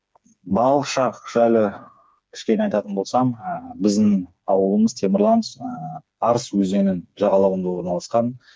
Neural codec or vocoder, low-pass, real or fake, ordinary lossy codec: codec, 16 kHz, 4 kbps, FreqCodec, smaller model; none; fake; none